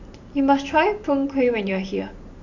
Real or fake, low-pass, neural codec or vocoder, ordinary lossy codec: fake; 7.2 kHz; vocoder, 44.1 kHz, 128 mel bands every 512 samples, BigVGAN v2; none